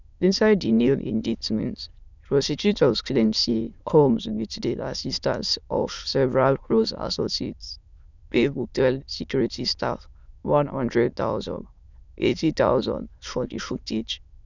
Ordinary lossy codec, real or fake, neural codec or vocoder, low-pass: none; fake; autoencoder, 22.05 kHz, a latent of 192 numbers a frame, VITS, trained on many speakers; 7.2 kHz